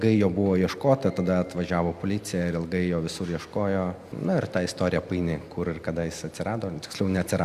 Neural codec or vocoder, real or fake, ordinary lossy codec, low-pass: none; real; Opus, 64 kbps; 14.4 kHz